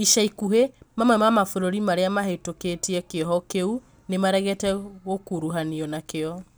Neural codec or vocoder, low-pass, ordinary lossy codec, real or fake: none; none; none; real